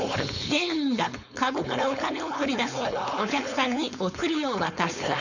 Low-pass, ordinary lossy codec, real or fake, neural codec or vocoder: 7.2 kHz; none; fake; codec, 16 kHz, 4.8 kbps, FACodec